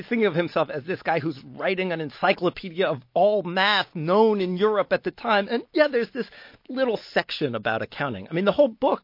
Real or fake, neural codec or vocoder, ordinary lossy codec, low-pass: real; none; MP3, 32 kbps; 5.4 kHz